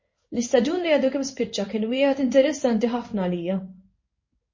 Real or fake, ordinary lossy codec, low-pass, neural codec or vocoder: fake; MP3, 32 kbps; 7.2 kHz; codec, 16 kHz in and 24 kHz out, 1 kbps, XY-Tokenizer